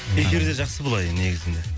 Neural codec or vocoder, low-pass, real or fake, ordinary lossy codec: none; none; real; none